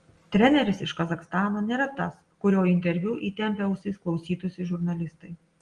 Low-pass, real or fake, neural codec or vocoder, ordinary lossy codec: 9.9 kHz; real; none; Opus, 24 kbps